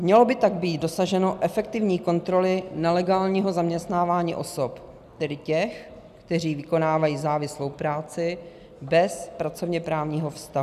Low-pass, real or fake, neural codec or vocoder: 14.4 kHz; real; none